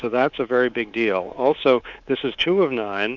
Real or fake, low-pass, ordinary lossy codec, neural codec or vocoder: fake; 7.2 kHz; Opus, 64 kbps; codec, 24 kHz, 3.1 kbps, DualCodec